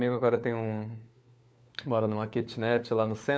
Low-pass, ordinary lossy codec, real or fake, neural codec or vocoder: none; none; fake; codec, 16 kHz, 4 kbps, FunCodec, trained on LibriTTS, 50 frames a second